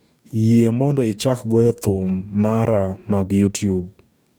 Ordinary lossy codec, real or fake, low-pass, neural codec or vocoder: none; fake; none; codec, 44.1 kHz, 2.6 kbps, DAC